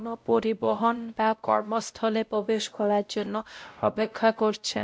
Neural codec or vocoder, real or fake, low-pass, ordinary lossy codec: codec, 16 kHz, 0.5 kbps, X-Codec, WavLM features, trained on Multilingual LibriSpeech; fake; none; none